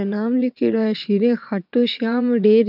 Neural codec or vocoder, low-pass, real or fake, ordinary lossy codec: vocoder, 22.05 kHz, 80 mel bands, WaveNeXt; 5.4 kHz; fake; none